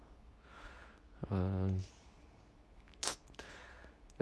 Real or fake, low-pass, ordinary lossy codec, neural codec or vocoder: fake; none; none; codec, 24 kHz, 0.9 kbps, WavTokenizer, medium speech release version 2